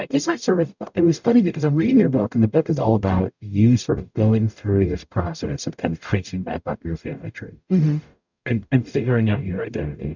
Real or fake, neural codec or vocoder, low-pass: fake; codec, 44.1 kHz, 0.9 kbps, DAC; 7.2 kHz